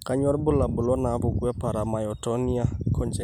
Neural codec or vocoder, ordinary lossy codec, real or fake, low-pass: none; none; real; 19.8 kHz